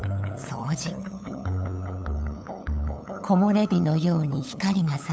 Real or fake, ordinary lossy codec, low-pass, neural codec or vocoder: fake; none; none; codec, 16 kHz, 8 kbps, FunCodec, trained on LibriTTS, 25 frames a second